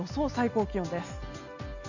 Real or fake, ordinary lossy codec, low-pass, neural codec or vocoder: real; none; 7.2 kHz; none